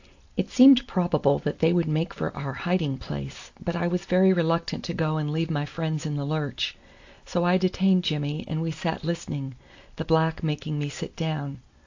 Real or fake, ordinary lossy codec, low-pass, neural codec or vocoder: real; AAC, 48 kbps; 7.2 kHz; none